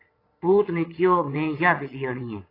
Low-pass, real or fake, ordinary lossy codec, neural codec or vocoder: 5.4 kHz; fake; AAC, 24 kbps; vocoder, 22.05 kHz, 80 mel bands, Vocos